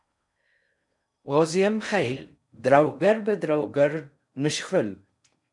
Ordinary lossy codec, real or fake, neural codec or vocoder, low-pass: MP3, 96 kbps; fake; codec, 16 kHz in and 24 kHz out, 0.6 kbps, FocalCodec, streaming, 4096 codes; 10.8 kHz